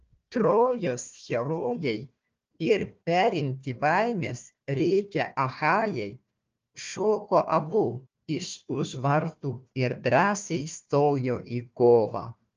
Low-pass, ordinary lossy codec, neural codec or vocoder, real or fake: 7.2 kHz; Opus, 24 kbps; codec, 16 kHz, 1 kbps, FunCodec, trained on Chinese and English, 50 frames a second; fake